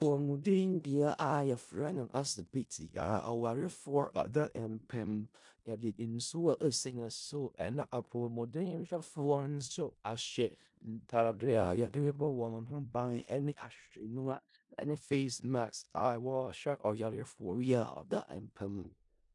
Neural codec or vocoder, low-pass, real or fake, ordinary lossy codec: codec, 16 kHz in and 24 kHz out, 0.4 kbps, LongCat-Audio-Codec, four codebook decoder; 10.8 kHz; fake; MP3, 64 kbps